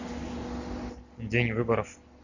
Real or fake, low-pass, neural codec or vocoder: real; 7.2 kHz; none